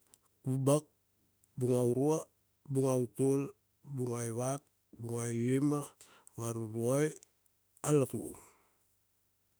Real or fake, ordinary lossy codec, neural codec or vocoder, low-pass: fake; none; autoencoder, 48 kHz, 32 numbers a frame, DAC-VAE, trained on Japanese speech; none